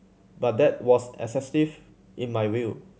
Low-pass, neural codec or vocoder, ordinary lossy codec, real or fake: none; none; none; real